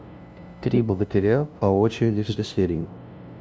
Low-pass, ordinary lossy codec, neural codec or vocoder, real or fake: none; none; codec, 16 kHz, 0.5 kbps, FunCodec, trained on LibriTTS, 25 frames a second; fake